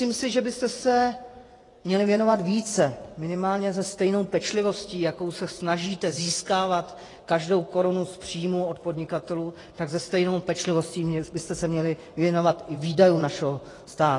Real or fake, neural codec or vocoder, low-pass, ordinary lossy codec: fake; vocoder, 24 kHz, 100 mel bands, Vocos; 10.8 kHz; AAC, 32 kbps